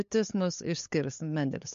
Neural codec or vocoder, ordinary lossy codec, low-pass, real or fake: codec, 16 kHz, 8 kbps, FunCodec, trained on LibriTTS, 25 frames a second; MP3, 48 kbps; 7.2 kHz; fake